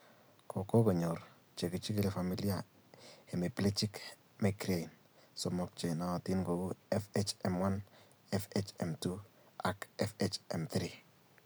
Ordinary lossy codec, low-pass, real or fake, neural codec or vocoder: none; none; real; none